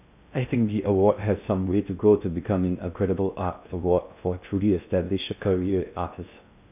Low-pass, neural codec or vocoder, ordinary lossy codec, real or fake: 3.6 kHz; codec, 16 kHz in and 24 kHz out, 0.6 kbps, FocalCodec, streaming, 2048 codes; none; fake